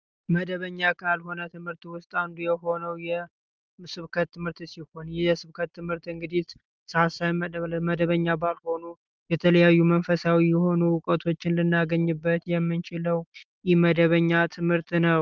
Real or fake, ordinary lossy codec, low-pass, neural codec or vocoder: real; Opus, 24 kbps; 7.2 kHz; none